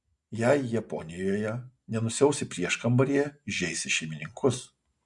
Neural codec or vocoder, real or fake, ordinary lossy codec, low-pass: none; real; MP3, 64 kbps; 10.8 kHz